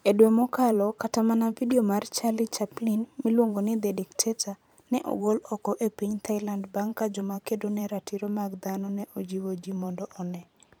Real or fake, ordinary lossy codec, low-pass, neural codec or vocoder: fake; none; none; vocoder, 44.1 kHz, 128 mel bands every 512 samples, BigVGAN v2